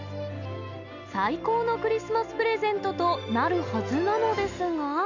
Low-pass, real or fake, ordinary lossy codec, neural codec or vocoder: 7.2 kHz; real; none; none